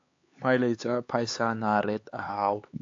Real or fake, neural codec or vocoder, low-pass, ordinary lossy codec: fake; codec, 16 kHz, 4 kbps, X-Codec, HuBERT features, trained on LibriSpeech; 7.2 kHz; AAC, 48 kbps